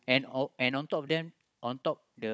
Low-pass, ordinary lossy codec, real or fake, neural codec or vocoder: none; none; fake; codec, 16 kHz, 16 kbps, FunCodec, trained on Chinese and English, 50 frames a second